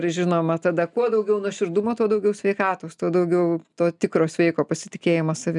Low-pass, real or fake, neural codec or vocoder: 10.8 kHz; real; none